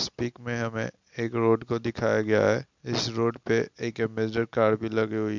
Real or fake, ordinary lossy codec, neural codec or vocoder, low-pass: real; MP3, 64 kbps; none; 7.2 kHz